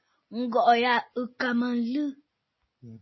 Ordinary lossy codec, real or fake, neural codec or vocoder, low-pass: MP3, 24 kbps; real; none; 7.2 kHz